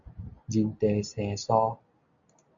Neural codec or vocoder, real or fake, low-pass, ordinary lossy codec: none; real; 7.2 kHz; MP3, 64 kbps